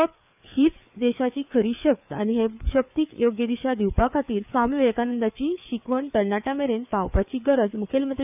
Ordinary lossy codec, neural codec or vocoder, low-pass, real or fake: none; codec, 16 kHz, 4 kbps, FreqCodec, larger model; 3.6 kHz; fake